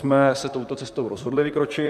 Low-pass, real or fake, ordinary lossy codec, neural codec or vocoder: 14.4 kHz; fake; Opus, 64 kbps; vocoder, 44.1 kHz, 128 mel bands, Pupu-Vocoder